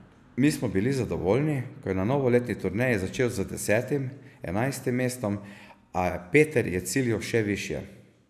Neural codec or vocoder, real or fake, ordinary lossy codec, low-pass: none; real; none; 14.4 kHz